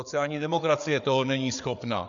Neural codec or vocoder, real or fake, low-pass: codec, 16 kHz, 8 kbps, FreqCodec, larger model; fake; 7.2 kHz